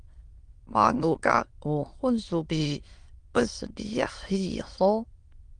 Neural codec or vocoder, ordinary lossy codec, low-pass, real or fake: autoencoder, 22.05 kHz, a latent of 192 numbers a frame, VITS, trained on many speakers; Opus, 24 kbps; 9.9 kHz; fake